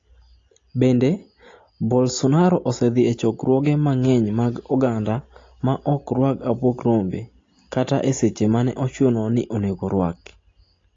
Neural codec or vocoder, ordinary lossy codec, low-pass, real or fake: none; AAC, 32 kbps; 7.2 kHz; real